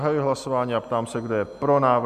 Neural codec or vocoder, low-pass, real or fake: none; 14.4 kHz; real